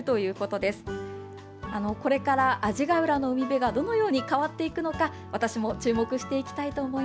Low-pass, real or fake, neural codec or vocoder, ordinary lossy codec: none; real; none; none